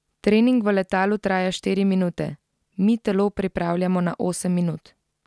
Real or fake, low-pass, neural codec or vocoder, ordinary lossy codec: real; none; none; none